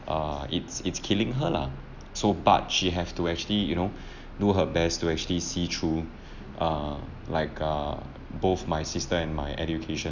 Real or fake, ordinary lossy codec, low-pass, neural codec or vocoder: real; none; 7.2 kHz; none